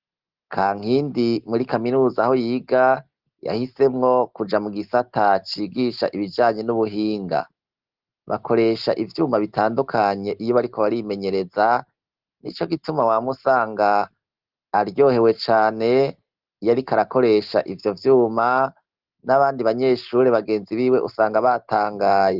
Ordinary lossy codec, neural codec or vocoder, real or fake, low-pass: Opus, 16 kbps; none; real; 5.4 kHz